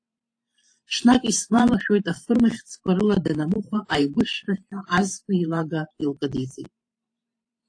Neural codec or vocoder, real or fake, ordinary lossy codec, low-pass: vocoder, 44.1 kHz, 128 mel bands every 512 samples, BigVGAN v2; fake; AAC, 48 kbps; 9.9 kHz